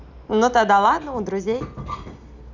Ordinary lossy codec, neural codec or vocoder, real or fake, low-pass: none; none; real; 7.2 kHz